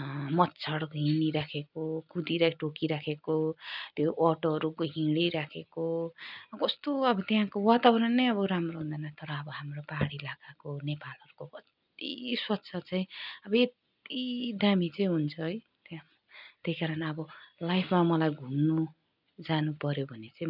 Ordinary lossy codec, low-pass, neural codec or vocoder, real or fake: none; 5.4 kHz; none; real